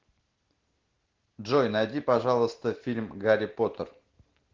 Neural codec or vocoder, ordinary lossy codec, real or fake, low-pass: none; Opus, 16 kbps; real; 7.2 kHz